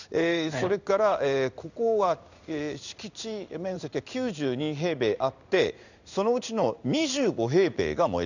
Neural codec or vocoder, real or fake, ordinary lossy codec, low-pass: codec, 16 kHz in and 24 kHz out, 1 kbps, XY-Tokenizer; fake; none; 7.2 kHz